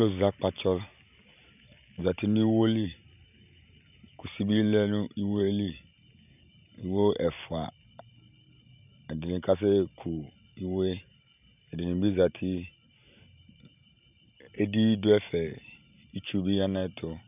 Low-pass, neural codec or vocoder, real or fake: 3.6 kHz; vocoder, 44.1 kHz, 128 mel bands every 512 samples, BigVGAN v2; fake